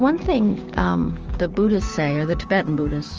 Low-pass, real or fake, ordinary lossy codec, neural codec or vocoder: 7.2 kHz; fake; Opus, 24 kbps; codec, 16 kHz, 6 kbps, DAC